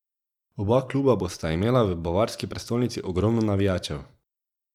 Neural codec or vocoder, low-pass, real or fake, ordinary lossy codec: vocoder, 44.1 kHz, 128 mel bands every 512 samples, BigVGAN v2; 19.8 kHz; fake; none